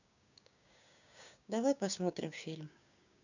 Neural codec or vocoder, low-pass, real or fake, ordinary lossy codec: codec, 16 kHz, 6 kbps, DAC; 7.2 kHz; fake; none